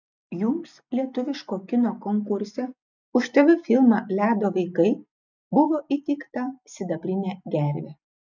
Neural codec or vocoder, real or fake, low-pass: none; real; 7.2 kHz